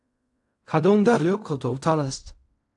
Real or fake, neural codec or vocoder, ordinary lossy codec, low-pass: fake; codec, 16 kHz in and 24 kHz out, 0.4 kbps, LongCat-Audio-Codec, fine tuned four codebook decoder; AAC, 64 kbps; 10.8 kHz